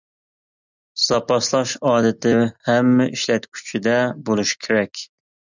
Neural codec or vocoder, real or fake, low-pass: none; real; 7.2 kHz